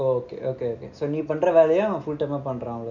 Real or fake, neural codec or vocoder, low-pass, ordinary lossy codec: real; none; 7.2 kHz; none